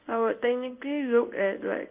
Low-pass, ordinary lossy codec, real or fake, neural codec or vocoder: 3.6 kHz; Opus, 24 kbps; fake; codec, 16 kHz in and 24 kHz out, 1 kbps, XY-Tokenizer